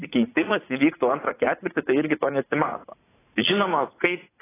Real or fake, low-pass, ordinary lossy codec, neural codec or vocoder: fake; 3.6 kHz; AAC, 16 kbps; vocoder, 44.1 kHz, 80 mel bands, Vocos